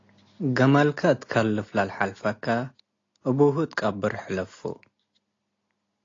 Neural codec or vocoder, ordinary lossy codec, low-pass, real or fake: none; AAC, 32 kbps; 7.2 kHz; real